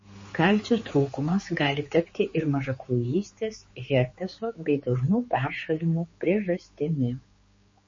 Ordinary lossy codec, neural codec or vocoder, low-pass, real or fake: MP3, 32 kbps; codec, 16 kHz, 4 kbps, X-Codec, HuBERT features, trained on general audio; 7.2 kHz; fake